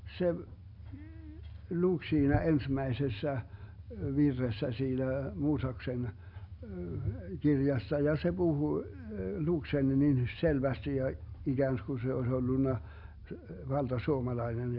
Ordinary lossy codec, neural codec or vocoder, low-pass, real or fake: none; none; 5.4 kHz; real